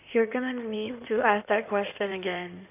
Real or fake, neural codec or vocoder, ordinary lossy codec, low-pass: fake; codec, 16 kHz in and 24 kHz out, 2.2 kbps, FireRedTTS-2 codec; none; 3.6 kHz